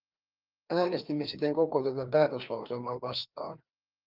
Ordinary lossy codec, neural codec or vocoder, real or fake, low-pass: Opus, 24 kbps; codec, 16 kHz, 2 kbps, FreqCodec, larger model; fake; 5.4 kHz